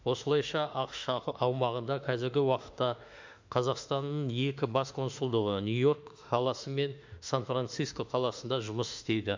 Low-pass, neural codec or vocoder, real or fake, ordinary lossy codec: 7.2 kHz; autoencoder, 48 kHz, 32 numbers a frame, DAC-VAE, trained on Japanese speech; fake; MP3, 64 kbps